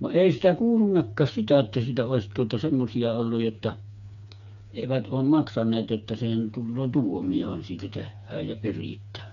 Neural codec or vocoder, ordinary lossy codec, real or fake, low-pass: codec, 16 kHz, 4 kbps, FreqCodec, smaller model; none; fake; 7.2 kHz